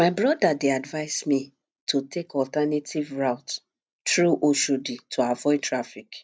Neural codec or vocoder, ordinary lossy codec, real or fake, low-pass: none; none; real; none